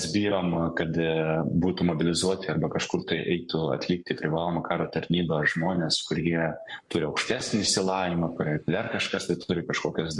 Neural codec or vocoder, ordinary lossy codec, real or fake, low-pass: codec, 44.1 kHz, 7.8 kbps, Pupu-Codec; MP3, 64 kbps; fake; 10.8 kHz